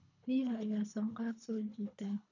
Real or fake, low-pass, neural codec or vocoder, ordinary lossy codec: fake; 7.2 kHz; codec, 24 kHz, 3 kbps, HILCodec; none